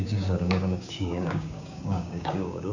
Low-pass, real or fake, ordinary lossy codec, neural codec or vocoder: 7.2 kHz; fake; none; codec, 16 kHz, 6 kbps, DAC